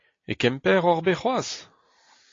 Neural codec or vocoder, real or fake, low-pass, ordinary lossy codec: none; real; 7.2 kHz; AAC, 32 kbps